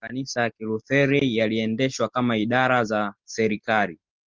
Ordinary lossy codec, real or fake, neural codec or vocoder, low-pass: Opus, 24 kbps; real; none; 7.2 kHz